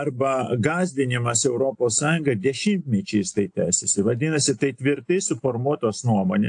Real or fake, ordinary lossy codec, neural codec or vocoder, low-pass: real; AAC, 64 kbps; none; 9.9 kHz